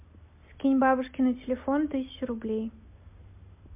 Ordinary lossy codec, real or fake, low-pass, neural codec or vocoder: MP3, 32 kbps; real; 3.6 kHz; none